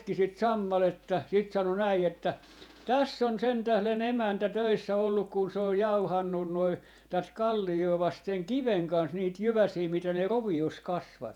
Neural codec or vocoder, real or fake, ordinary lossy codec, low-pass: vocoder, 48 kHz, 128 mel bands, Vocos; fake; none; 19.8 kHz